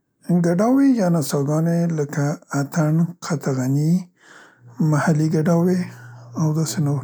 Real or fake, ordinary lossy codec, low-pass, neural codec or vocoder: real; none; none; none